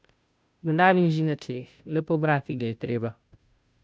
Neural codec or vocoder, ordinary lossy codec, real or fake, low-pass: codec, 16 kHz, 0.5 kbps, FunCodec, trained on Chinese and English, 25 frames a second; none; fake; none